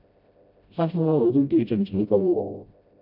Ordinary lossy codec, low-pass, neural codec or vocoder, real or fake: MP3, 48 kbps; 5.4 kHz; codec, 16 kHz, 0.5 kbps, FreqCodec, smaller model; fake